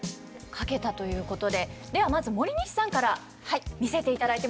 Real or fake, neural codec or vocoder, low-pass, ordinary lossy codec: real; none; none; none